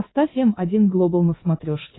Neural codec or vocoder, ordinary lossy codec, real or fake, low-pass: codec, 24 kHz, 3.1 kbps, DualCodec; AAC, 16 kbps; fake; 7.2 kHz